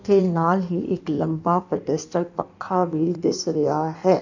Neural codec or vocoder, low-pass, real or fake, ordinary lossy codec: codec, 16 kHz in and 24 kHz out, 1.1 kbps, FireRedTTS-2 codec; 7.2 kHz; fake; none